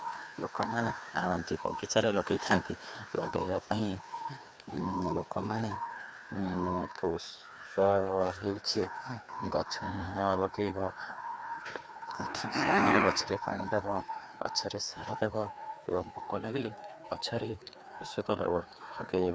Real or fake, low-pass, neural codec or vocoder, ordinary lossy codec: fake; none; codec, 16 kHz, 2 kbps, FreqCodec, larger model; none